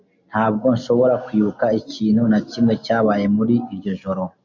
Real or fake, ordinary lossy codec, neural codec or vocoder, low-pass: real; MP3, 64 kbps; none; 7.2 kHz